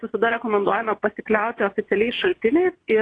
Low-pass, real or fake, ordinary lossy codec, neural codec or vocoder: 9.9 kHz; fake; AAC, 32 kbps; vocoder, 22.05 kHz, 80 mel bands, WaveNeXt